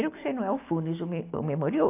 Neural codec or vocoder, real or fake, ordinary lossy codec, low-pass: none; real; AAC, 24 kbps; 3.6 kHz